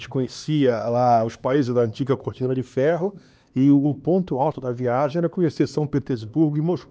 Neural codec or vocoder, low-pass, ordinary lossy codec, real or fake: codec, 16 kHz, 2 kbps, X-Codec, HuBERT features, trained on LibriSpeech; none; none; fake